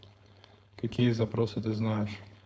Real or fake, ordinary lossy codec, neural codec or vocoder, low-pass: fake; none; codec, 16 kHz, 4.8 kbps, FACodec; none